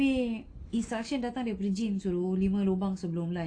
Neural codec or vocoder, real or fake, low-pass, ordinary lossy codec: none; real; 9.9 kHz; AAC, 48 kbps